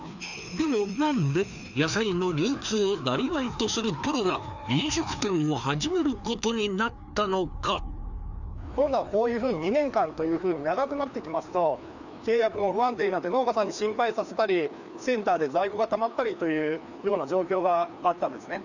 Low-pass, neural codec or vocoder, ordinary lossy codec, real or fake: 7.2 kHz; codec, 16 kHz, 2 kbps, FreqCodec, larger model; none; fake